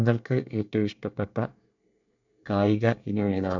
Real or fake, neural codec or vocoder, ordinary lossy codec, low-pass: fake; codec, 24 kHz, 1 kbps, SNAC; none; 7.2 kHz